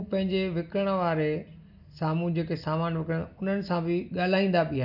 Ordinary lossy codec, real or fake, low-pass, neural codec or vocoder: none; real; 5.4 kHz; none